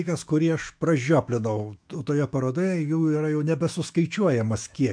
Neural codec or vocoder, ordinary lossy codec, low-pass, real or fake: autoencoder, 48 kHz, 128 numbers a frame, DAC-VAE, trained on Japanese speech; MP3, 64 kbps; 9.9 kHz; fake